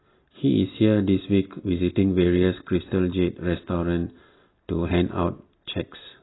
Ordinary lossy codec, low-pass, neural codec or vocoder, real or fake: AAC, 16 kbps; 7.2 kHz; none; real